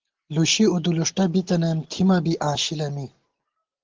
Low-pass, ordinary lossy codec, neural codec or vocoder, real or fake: 7.2 kHz; Opus, 16 kbps; none; real